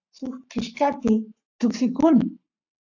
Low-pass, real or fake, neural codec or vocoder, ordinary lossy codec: 7.2 kHz; fake; codec, 44.1 kHz, 7.8 kbps, Pupu-Codec; AAC, 48 kbps